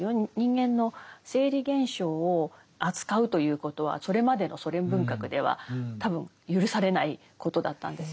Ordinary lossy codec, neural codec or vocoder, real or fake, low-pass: none; none; real; none